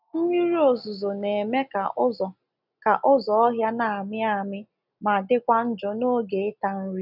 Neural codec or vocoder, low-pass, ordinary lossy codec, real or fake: none; 5.4 kHz; none; real